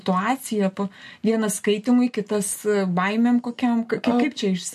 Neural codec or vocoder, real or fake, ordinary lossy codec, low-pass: none; real; MP3, 64 kbps; 14.4 kHz